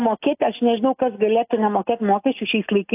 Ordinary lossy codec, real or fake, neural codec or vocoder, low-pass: AAC, 32 kbps; real; none; 3.6 kHz